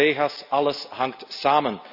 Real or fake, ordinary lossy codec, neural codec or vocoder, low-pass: real; none; none; 5.4 kHz